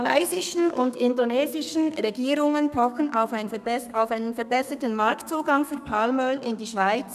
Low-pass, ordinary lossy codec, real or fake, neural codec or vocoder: 14.4 kHz; none; fake; codec, 32 kHz, 1.9 kbps, SNAC